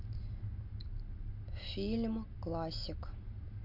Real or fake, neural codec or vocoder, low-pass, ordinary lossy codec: real; none; 5.4 kHz; none